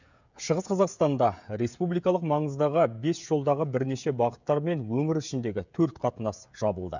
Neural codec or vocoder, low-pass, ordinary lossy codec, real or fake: codec, 16 kHz, 16 kbps, FreqCodec, smaller model; 7.2 kHz; none; fake